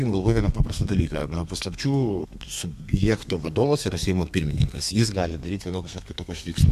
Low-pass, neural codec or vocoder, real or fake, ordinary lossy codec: 14.4 kHz; codec, 32 kHz, 1.9 kbps, SNAC; fake; MP3, 96 kbps